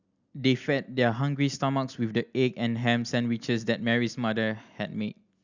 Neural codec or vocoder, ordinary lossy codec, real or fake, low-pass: none; Opus, 64 kbps; real; 7.2 kHz